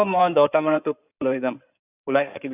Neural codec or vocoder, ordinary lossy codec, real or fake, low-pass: codec, 16 kHz in and 24 kHz out, 2.2 kbps, FireRedTTS-2 codec; none; fake; 3.6 kHz